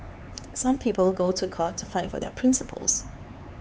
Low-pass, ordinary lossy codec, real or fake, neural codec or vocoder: none; none; fake; codec, 16 kHz, 4 kbps, X-Codec, HuBERT features, trained on LibriSpeech